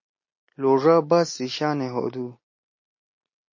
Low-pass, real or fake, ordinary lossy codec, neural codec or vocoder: 7.2 kHz; real; MP3, 32 kbps; none